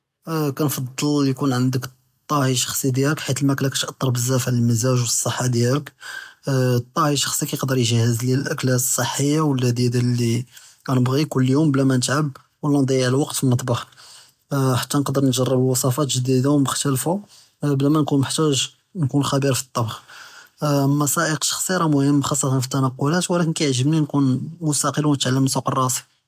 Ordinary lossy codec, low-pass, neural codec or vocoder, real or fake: AAC, 96 kbps; 14.4 kHz; none; real